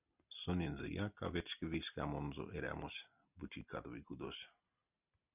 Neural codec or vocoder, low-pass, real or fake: none; 3.6 kHz; real